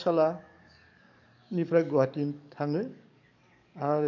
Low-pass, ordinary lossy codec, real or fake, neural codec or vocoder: 7.2 kHz; none; fake; codec, 44.1 kHz, 7.8 kbps, DAC